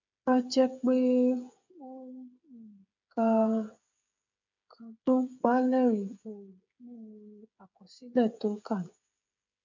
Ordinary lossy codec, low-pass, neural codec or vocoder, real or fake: MP3, 64 kbps; 7.2 kHz; codec, 16 kHz, 8 kbps, FreqCodec, smaller model; fake